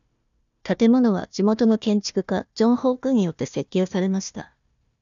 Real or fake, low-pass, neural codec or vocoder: fake; 7.2 kHz; codec, 16 kHz, 1 kbps, FunCodec, trained on Chinese and English, 50 frames a second